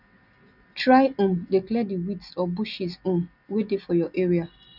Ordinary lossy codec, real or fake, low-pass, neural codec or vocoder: none; real; 5.4 kHz; none